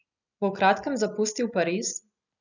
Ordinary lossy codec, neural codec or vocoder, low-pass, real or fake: none; none; 7.2 kHz; real